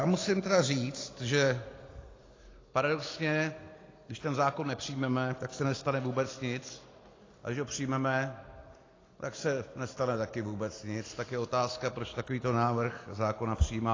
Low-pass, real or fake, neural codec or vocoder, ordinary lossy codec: 7.2 kHz; real; none; AAC, 32 kbps